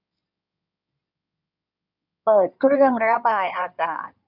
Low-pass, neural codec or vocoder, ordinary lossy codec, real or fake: 5.4 kHz; codec, 16 kHz in and 24 kHz out, 2.2 kbps, FireRedTTS-2 codec; none; fake